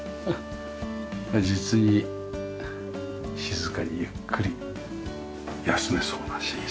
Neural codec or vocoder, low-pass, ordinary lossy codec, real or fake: none; none; none; real